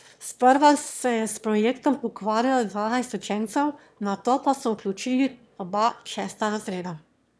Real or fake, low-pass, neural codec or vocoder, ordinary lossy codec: fake; none; autoencoder, 22.05 kHz, a latent of 192 numbers a frame, VITS, trained on one speaker; none